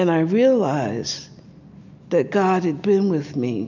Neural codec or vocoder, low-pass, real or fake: none; 7.2 kHz; real